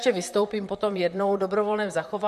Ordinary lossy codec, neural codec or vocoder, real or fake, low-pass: MP3, 64 kbps; vocoder, 44.1 kHz, 128 mel bands, Pupu-Vocoder; fake; 14.4 kHz